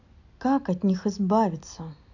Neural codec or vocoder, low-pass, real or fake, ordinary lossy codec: none; 7.2 kHz; real; none